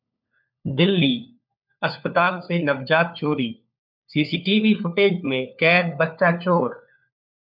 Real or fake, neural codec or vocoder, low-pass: fake; codec, 16 kHz, 4 kbps, FunCodec, trained on LibriTTS, 50 frames a second; 5.4 kHz